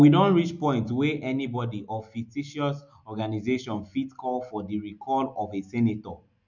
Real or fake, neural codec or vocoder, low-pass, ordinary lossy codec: real; none; 7.2 kHz; none